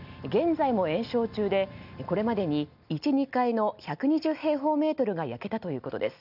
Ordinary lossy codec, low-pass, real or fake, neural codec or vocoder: none; 5.4 kHz; real; none